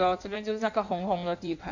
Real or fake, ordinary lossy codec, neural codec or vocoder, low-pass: fake; none; codec, 16 kHz in and 24 kHz out, 1.1 kbps, FireRedTTS-2 codec; 7.2 kHz